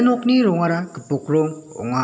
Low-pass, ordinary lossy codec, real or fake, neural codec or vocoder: none; none; real; none